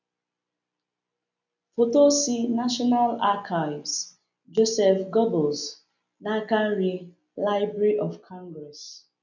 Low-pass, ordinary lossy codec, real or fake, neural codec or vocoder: 7.2 kHz; none; real; none